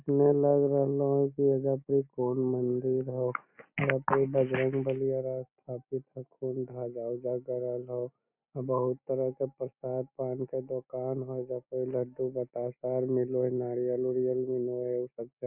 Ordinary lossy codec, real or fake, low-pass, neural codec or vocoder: none; real; 3.6 kHz; none